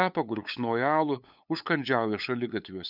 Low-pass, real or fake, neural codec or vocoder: 5.4 kHz; fake; codec, 16 kHz, 8 kbps, FunCodec, trained on LibriTTS, 25 frames a second